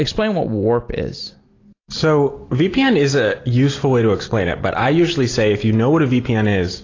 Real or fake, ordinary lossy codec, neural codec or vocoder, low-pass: real; AAC, 32 kbps; none; 7.2 kHz